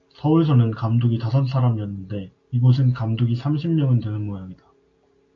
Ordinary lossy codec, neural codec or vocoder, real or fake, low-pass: AAC, 48 kbps; none; real; 7.2 kHz